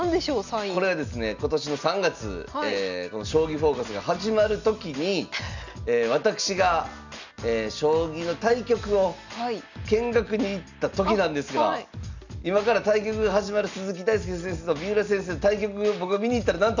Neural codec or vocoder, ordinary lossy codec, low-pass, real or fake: none; none; 7.2 kHz; real